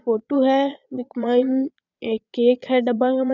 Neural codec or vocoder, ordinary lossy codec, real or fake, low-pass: vocoder, 22.05 kHz, 80 mel bands, Vocos; none; fake; 7.2 kHz